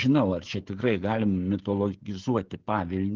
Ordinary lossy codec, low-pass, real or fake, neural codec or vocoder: Opus, 16 kbps; 7.2 kHz; fake; codec, 16 kHz, 16 kbps, FreqCodec, smaller model